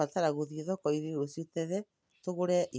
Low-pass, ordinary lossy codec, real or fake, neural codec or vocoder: none; none; real; none